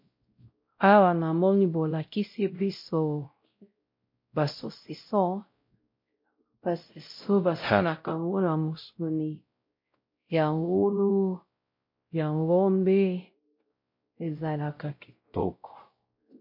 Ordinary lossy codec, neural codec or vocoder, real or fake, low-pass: MP3, 32 kbps; codec, 16 kHz, 0.5 kbps, X-Codec, WavLM features, trained on Multilingual LibriSpeech; fake; 5.4 kHz